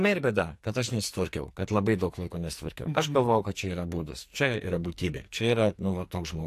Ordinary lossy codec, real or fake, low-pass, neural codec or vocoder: AAC, 64 kbps; fake; 14.4 kHz; codec, 44.1 kHz, 2.6 kbps, SNAC